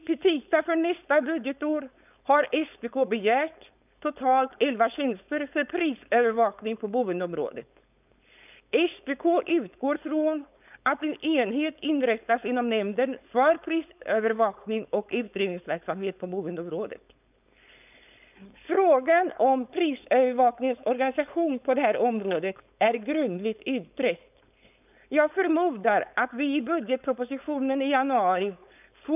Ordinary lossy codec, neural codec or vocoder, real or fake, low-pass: none; codec, 16 kHz, 4.8 kbps, FACodec; fake; 3.6 kHz